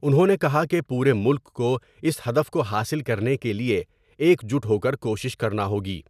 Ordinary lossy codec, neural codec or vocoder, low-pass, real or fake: none; none; 14.4 kHz; real